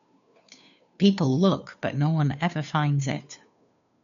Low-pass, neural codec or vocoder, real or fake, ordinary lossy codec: 7.2 kHz; codec, 16 kHz, 2 kbps, FunCodec, trained on Chinese and English, 25 frames a second; fake; none